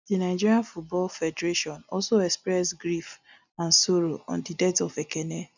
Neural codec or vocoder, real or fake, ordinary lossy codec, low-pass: none; real; none; 7.2 kHz